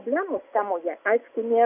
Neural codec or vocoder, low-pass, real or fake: none; 3.6 kHz; real